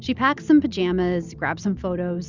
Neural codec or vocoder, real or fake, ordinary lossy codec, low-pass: none; real; Opus, 64 kbps; 7.2 kHz